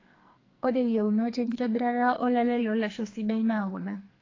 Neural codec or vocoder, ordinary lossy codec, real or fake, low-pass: codec, 44.1 kHz, 2.6 kbps, SNAC; AAC, 32 kbps; fake; 7.2 kHz